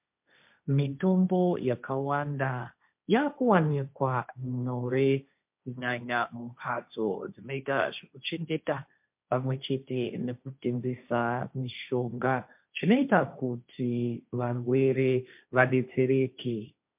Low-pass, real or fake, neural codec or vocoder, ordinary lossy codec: 3.6 kHz; fake; codec, 16 kHz, 1.1 kbps, Voila-Tokenizer; MP3, 32 kbps